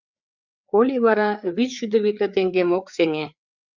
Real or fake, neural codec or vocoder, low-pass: fake; codec, 16 kHz, 4 kbps, FreqCodec, larger model; 7.2 kHz